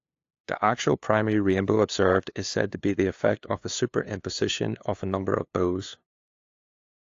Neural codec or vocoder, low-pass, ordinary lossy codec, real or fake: codec, 16 kHz, 2 kbps, FunCodec, trained on LibriTTS, 25 frames a second; 7.2 kHz; AAC, 48 kbps; fake